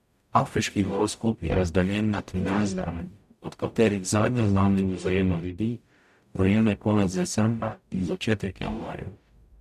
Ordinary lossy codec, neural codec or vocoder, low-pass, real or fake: none; codec, 44.1 kHz, 0.9 kbps, DAC; 14.4 kHz; fake